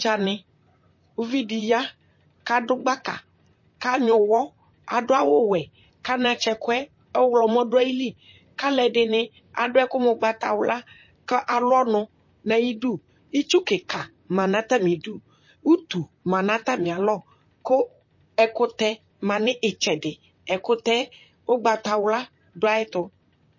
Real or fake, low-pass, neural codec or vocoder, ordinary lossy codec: fake; 7.2 kHz; vocoder, 44.1 kHz, 128 mel bands, Pupu-Vocoder; MP3, 32 kbps